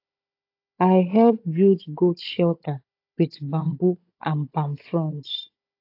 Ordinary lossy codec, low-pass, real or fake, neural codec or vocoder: AAC, 32 kbps; 5.4 kHz; fake; codec, 16 kHz, 16 kbps, FunCodec, trained on Chinese and English, 50 frames a second